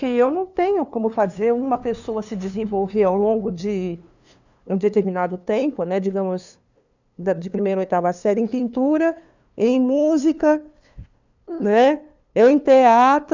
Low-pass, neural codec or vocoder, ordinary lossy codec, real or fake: 7.2 kHz; codec, 16 kHz, 2 kbps, FunCodec, trained on LibriTTS, 25 frames a second; none; fake